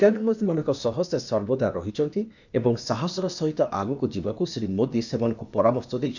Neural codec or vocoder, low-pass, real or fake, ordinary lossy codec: codec, 16 kHz, 0.8 kbps, ZipCodec; 7.2 kHz; fake; none